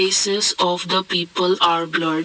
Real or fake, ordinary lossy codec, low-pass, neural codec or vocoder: real; none; none; none